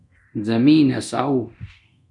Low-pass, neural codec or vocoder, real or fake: 10.8 kHz; codec, 24 kHz, 0.9 kbps, DualCodec; fake